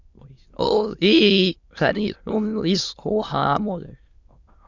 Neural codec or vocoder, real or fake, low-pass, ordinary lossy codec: autoencoder, 22.05 kHz, a latent of 192 numbers a frame, VITS, trained on many speakers; fake; 7.2 kHz; Opus, 64 kbps